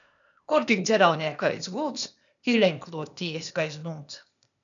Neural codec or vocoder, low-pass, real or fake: codec, 16 kHz, 0.8 kbps, ZipCodec; 7.2 kHz; fake